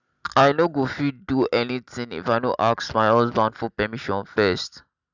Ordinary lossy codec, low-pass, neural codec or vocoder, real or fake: none; 7.2 kHz; none; real